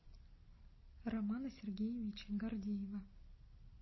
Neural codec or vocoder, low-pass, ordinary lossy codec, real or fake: none; 7.2 kHz; MP3, 24 kbps; real